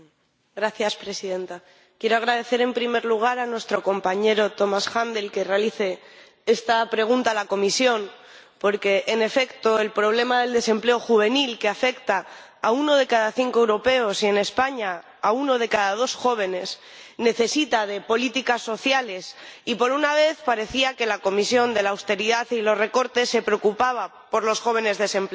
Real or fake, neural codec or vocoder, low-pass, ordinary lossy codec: real; none; none; none